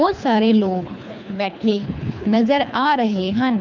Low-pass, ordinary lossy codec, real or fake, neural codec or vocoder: 7.2 kHz; none; fake; codec, 24 kHz, 3 kbps, HILCodec